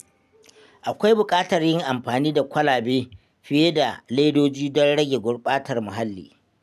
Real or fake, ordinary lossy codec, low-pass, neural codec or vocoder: real; none; 14.4 kHz; none